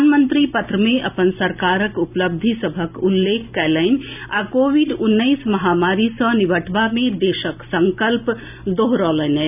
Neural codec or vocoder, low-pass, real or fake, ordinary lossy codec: none; 3.6 kHz; real; none